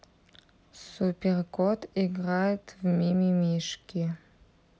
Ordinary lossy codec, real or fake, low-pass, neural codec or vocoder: none; real; none; none